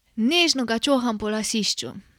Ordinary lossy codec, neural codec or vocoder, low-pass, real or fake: none; none; 19.8 kHz; real